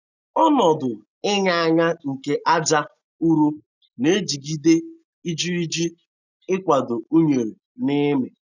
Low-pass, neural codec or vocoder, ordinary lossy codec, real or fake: 7.2 kHz; none; none; real